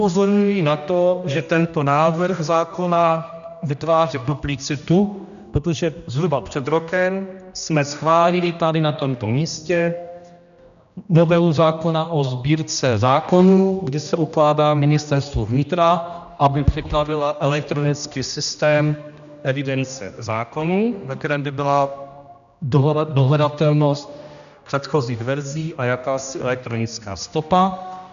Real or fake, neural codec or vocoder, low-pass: fake; codec, 16 kHz, 1 kbps, X-Codec, HuBERT features, trained on general audio; 7.2 kHz